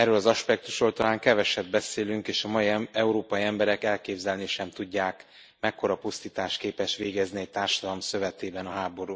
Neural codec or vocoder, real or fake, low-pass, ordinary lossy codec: none; real; none; none